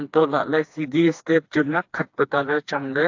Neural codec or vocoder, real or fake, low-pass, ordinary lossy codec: codec, 16 kHz, 2 kbps, FreqCodec, smaller model; fake; 7.2 kHz; AAC, 48 kbps